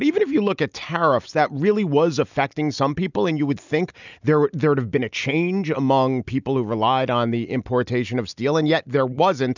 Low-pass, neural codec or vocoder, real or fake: 7.2 kHz; none; real